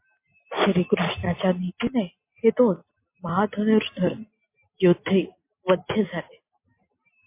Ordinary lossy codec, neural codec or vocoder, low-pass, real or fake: MP3, 24 kbps; none; 3.6 kHz; real